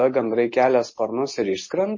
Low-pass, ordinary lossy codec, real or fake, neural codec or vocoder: 7.2 kHz; MP3, 32 kbps; real; none